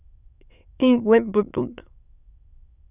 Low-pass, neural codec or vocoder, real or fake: 3.6 kHz; autoencoder, 22.05 kHz, a latent of 192 numbers a frame, VITS, trained on many speakers; fake